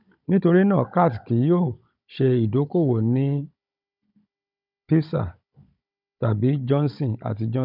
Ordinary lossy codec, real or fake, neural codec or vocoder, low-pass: none; fake; codec, 16 kHz, 16 kbps, FunCodec, trained on Chinese and English, 50 frames a second; 5.4 kHz